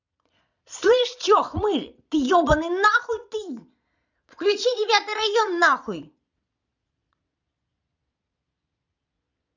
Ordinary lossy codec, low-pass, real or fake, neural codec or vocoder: none; 7.2 kHz; fake; vocoder, 22.05 kHz, 80 mel bands, Vocos